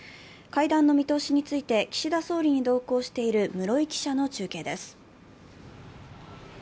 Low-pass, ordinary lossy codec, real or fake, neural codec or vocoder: none; none; real; none